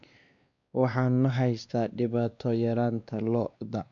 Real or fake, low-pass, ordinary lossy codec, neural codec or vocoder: fake; 7.2 kHz; AAC, 64 kbps; codec, 16 kHz, 2 kbps, X-Codec, WavLM features, trained on Multilingual LibriSpeech